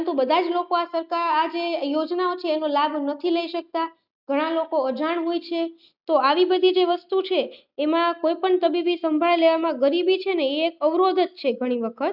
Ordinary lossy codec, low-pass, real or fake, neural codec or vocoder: none; 5.4 kHz; real; none